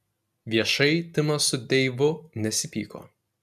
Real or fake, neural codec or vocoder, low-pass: real; none; 14.4 kHz